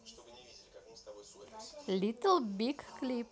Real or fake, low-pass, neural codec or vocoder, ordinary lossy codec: real; none; none; none